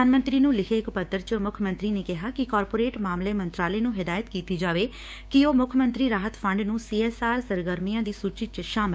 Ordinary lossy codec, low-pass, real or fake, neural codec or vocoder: none; none; fake; codec, 16 kHz, 6 kbps, DAC